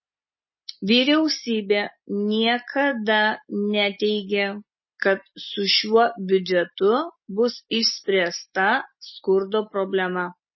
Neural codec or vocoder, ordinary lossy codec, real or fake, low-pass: none; MP3, 24 kbps; real; 7.2 kHz